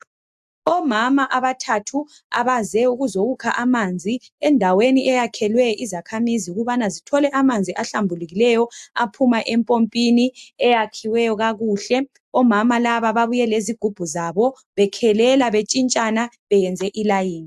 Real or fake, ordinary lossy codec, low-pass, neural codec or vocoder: real; AAC, 96 kbps; 14.4 kHz; none